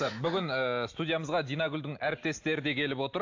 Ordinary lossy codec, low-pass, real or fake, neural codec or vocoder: AAC, 48 kbps; 7.2 kHz; real; none